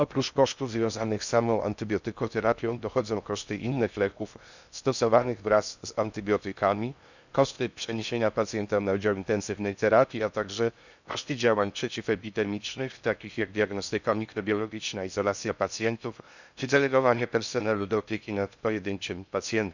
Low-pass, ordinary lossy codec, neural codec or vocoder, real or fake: 7.2 kHz; none; codec, 16 kHz in and 24 kHz out, 0.6 kbps, FocalCodec, streaming, 2048 codes; fake